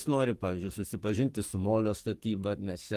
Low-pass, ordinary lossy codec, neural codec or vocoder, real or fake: 14.4 kHz; Opus, 24 kbps; codec, 44.1 kHz, 2.6 kbps, SNAC; fake